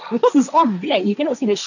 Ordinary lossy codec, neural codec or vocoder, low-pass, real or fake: none; codec, 16 kHz, 1.1 kbps, Voila-Tokenizer; 7.2 kHz; fake